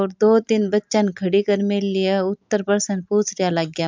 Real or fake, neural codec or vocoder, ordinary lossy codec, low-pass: real; none; MP3, 64 kbps; 7.2 kHz